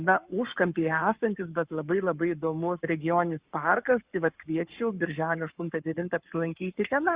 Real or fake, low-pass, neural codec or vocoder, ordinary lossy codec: fake; 3.6 kHz; codec, 44.1 kHz, 7.8 kbps, Pupu-Codec; Opus, 64 kbps